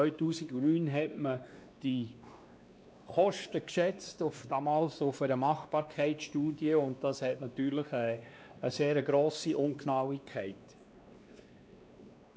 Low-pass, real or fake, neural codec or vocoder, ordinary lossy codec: none; fake; codec, 16 kHz, 2 kbps, X-Codec, WavLM features, trained on Multilingual LibriSpeech; none